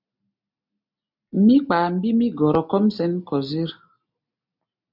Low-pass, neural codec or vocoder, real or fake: 5.4 kHz; none; real